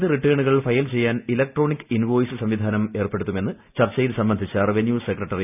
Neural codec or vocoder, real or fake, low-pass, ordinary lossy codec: none; real; 3.6 kHz; none